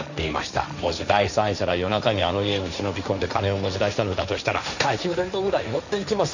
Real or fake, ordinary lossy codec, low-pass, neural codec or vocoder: fake; none; 7.2 kHz; codec, 16 kHz, 1.1 kbps, Voila-Tokenizer